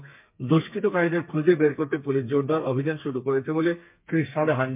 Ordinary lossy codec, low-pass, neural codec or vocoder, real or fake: MP3, 32 kbps; 3.6 kHz; codec, 32 kHz, 1.9 kbps, SNAC; fake